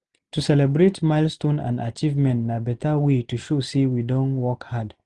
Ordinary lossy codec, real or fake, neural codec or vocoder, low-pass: Opus, 24 kbps; real; none; 10.8 kHz